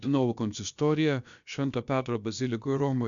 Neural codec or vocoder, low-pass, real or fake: codec, 16 kHz, about 1 kbps, DyCAST, with the encoder's durations; 7.2 kHz; fake